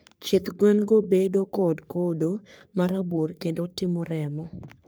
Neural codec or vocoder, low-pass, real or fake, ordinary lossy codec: codec, 44.1 kHz, 3.4 kbps, Pupu-Codec; none; fake; none